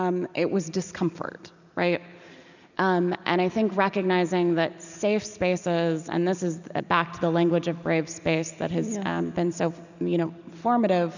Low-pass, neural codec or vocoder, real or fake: 7.2 kHz; none; real